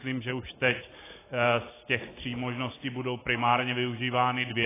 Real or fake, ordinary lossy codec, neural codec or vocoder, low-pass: real; AAC, 16 kbps; none; 3.6 kHz